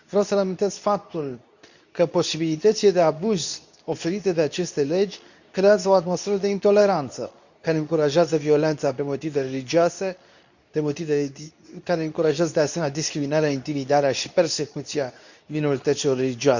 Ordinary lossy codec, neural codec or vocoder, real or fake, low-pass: none; codec, 24 kHz, 0.9 kbps, WavTokenizer, medium speech release version 2; fake; 7.2 kHz